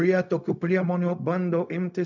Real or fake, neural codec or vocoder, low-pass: fake; codec, 16 kHz, 0.4 kbps, LongCat-Audio-Codec; 7.2 kHz